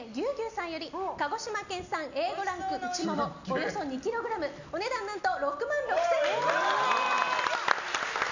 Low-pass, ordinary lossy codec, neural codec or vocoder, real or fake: 7.2 kHz; none; none; real